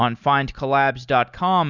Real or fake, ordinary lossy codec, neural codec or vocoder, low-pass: real; Opus, 64 kbps; none; 7.2 kHz